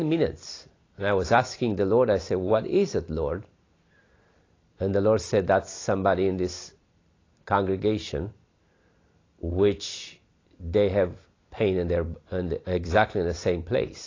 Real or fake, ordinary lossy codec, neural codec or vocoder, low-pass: real; AAC, 32 kbps; none; 7.2 kHz